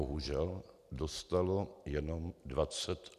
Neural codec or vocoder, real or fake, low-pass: none; real; 14.4 kHz